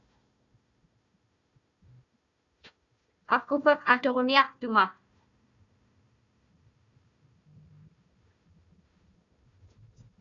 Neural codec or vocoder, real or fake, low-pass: codec, 16 kHz, 1 kbps, FunCodec, trained on Chinese and English, 50 frames a second; fake; 7.2 kHz